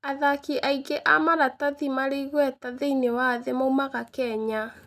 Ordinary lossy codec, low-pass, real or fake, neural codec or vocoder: none; 14.4 kHz; real; none